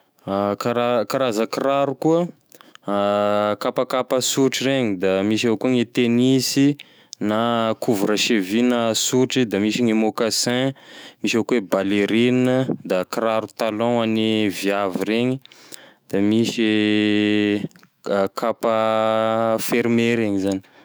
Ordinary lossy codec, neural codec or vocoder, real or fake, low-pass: none; autoencoder, 48 kHz, 128 numbers a frame, DAC-VAE, trained on Japanese speech; fake; none